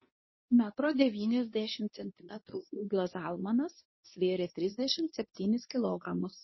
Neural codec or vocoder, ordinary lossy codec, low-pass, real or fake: codec, 24 kHz, 0.9 kbps, WavTokenizer, medium speech release version 2; MP3, 24 kbps; 7.2 kHz; fake